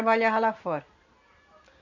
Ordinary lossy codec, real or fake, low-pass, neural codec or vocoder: none; real; 7.2 kHz; none